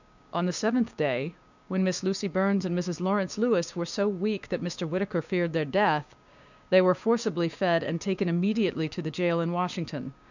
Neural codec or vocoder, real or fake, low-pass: codec, 16 kHz, 6 kbps, DAC; fake; 7.2 kHz